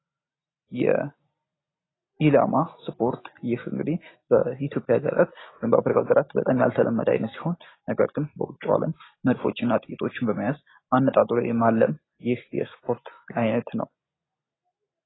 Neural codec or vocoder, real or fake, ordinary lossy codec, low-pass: none; real; AAC, 16 kbps; 7.2 kHz